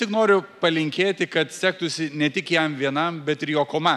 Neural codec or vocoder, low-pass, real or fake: none; 14.4 kHz; real